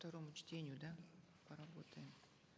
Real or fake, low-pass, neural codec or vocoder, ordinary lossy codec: real; none; none; none